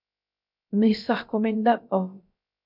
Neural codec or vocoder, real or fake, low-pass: codec, 16 kHz, 0.3 kbps, FocalCodec; fake; 5.4 kHz